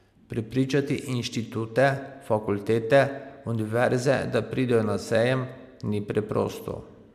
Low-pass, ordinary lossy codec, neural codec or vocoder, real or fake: 14.4 kHz; MP3, 96 kbps; none; real